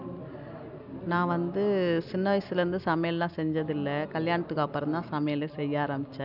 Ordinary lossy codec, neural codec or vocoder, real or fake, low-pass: none; none; real; 5.4 kHz